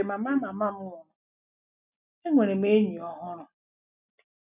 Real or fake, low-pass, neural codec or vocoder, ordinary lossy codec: real; 3.6 kHz; none; none